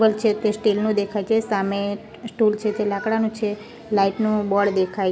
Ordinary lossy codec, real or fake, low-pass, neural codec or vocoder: none; real; none; none